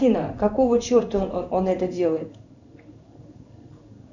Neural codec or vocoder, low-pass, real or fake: codec, 16 kHz in and 24 kHz out, 1 kbps, XY-Tokenizer; 7.2 kHz; fake